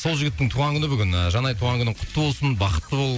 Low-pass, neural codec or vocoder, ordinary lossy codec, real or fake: none; none; none; real